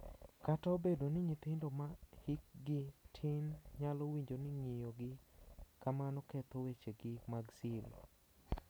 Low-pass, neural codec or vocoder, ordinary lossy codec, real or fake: none; none; none; real